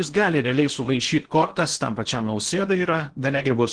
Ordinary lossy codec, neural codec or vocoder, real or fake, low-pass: Opus, 16 kbps; codec, 16 kHz in and 24 kHz out, 0.8 kbps, FocalCodec, streaming, 65536 codes; fake; 9.9 kHz